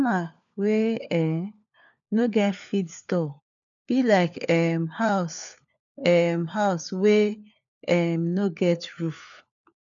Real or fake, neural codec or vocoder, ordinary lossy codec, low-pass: fake; codec, 16 kHz, 4 kbps, FunCodec, trained on LibriTTS, 50 frames a second; none; 7.2 kHz